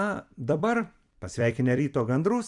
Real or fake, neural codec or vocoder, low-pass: fake; vocoder, 44.1 kHz, 128 mel bands every 256 samples, BigVGAN v2; 10.8 kHz